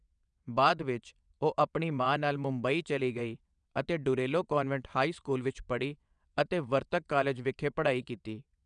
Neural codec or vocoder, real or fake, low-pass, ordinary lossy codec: vocoder, 22.05 kHz, 80 mel bands, WaveNeXt; fake; 9.9 kHz; none